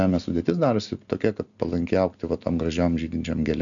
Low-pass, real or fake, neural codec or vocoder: 7.2 kHz; real; none